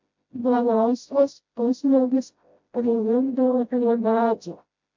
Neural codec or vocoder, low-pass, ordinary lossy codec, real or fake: codec, 16 kHz, 0.5 kbps, FreqCodec, smaller model; 7.2 kHz; MP3, 48 kbps; fake